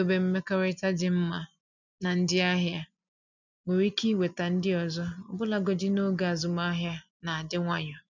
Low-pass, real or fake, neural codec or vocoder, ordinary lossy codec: 7.2 kHz; real; none; none